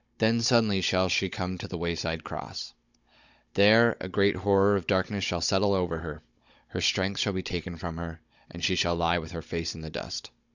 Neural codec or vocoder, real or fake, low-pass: codec, 16 kHz, 16 kbps, FunCodec, trained on Chinese and English, 50 frames a second; fake; 7.2 kHz